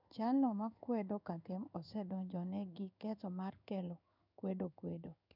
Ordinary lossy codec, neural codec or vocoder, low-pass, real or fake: none; codec, 16 kHz in and 24 kHz out, 1 kbps, XY-Tokenizer; 5.4 kHz; fake